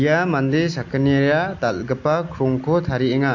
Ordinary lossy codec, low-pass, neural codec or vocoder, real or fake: AAC, 48 kbps; 7.2 kHz; none; real